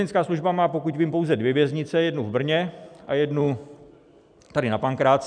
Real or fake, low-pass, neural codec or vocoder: real; 9.9 kHz; none